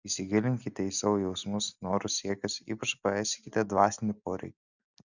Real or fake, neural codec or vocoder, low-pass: real; none; 7.2 kHz